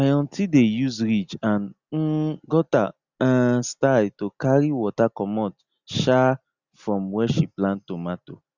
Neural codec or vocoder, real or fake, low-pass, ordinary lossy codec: none; real; 7.2 kHz; Opus, 64 kbps